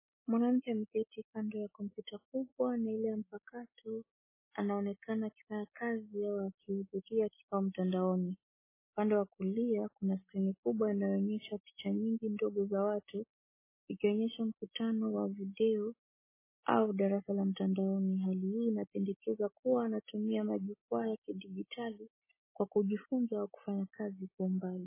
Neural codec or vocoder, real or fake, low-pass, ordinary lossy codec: none; real; 3.6 kHz; MP3, 16 kbps